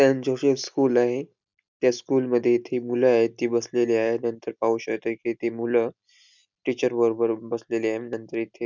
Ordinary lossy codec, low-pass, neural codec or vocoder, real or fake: none; 7.2 kHz; none; real